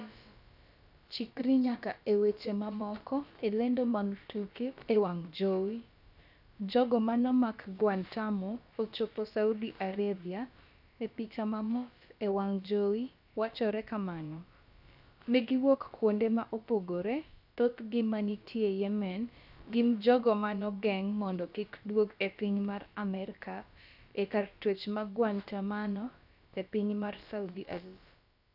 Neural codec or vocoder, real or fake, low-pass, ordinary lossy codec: codec, 16 kHz, about 1 kbps, DyCAST, with the encoder's durations; fake; 5.4 kHz; none